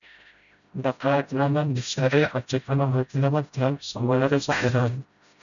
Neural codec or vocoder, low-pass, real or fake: codec, 16 kHz, 0.5 kbps, FreqCodec, smaller model; 7.2 kHz; fake